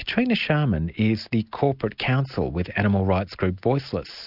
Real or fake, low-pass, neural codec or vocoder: real; 5.4 kHz; none